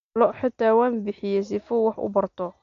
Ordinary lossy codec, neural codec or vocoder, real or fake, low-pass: MP3, 48 kbps; none; real; 7.2 kHz